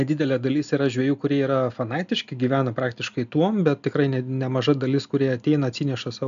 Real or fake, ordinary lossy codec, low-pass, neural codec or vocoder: real; AAC, 96 kbps; 7.2 kHz; none